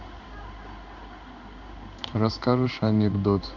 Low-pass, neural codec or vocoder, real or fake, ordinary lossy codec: 7.2 kHz; codec, 16 kHz in and 24 kHz out, 1 kbps, XY-Tokenizer; fake; none